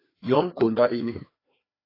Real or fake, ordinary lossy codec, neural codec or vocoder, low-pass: fake; AAC, 24 kbps; codec, 16 kHz, 2 kbps, FreqCodec, larger model; 5.4 kHz